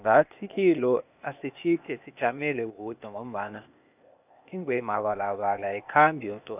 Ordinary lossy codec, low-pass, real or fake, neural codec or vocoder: none; 3.6 kHz; fake; codec, 16 kHz, 0.8 kbps, ZipCodec